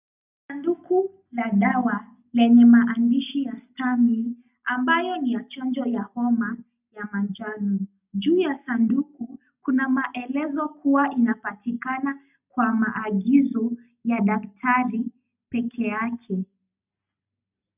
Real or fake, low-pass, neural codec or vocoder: real; 3.6 kHz; none